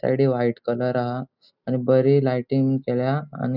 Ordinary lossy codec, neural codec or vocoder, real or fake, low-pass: none; none; real; 5.4 kHz